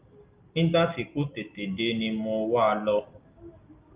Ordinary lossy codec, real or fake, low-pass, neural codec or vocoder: Opus, 32 kbps; real; 3.6 kHz; none